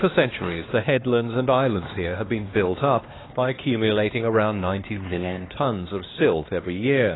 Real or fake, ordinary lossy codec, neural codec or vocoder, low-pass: fake; AAC, 16 kbps; codec, 16 kHz, 2 kbps, X-Codec, HuBERT features, trained on LibriSpeech; 7.2 kHz